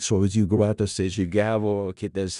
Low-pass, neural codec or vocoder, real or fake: 10.8 kHz; codec, 16 kHz in and 24 kHz out, 0.4 kbps, LongCat-Audio-Codec, four codebook decoder; fake